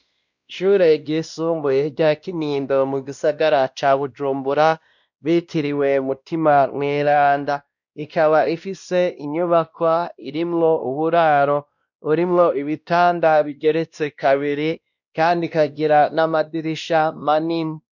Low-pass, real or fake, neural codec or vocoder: 7.2 kHz; fake; codec, 16 kHz, 1 kbps, X-Codec, WavLM features, trained on Multilingual LibriSpeech